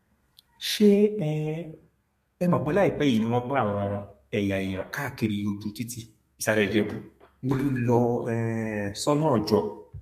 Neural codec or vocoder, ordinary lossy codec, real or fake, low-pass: codec, 32 kHz, 1.9 kbps, SNAC; MP3, 64 kbps; fake; 14.4 kHz